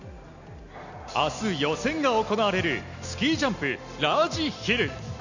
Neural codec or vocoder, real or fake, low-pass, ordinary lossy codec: none; real; 7.2 kHz; none